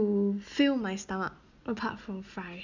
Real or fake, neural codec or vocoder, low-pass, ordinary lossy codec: real; none; 7.2 kHz; none